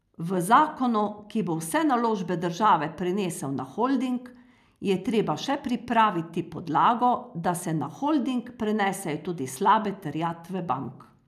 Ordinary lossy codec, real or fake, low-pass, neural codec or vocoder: none; real; 14.4 kHz; none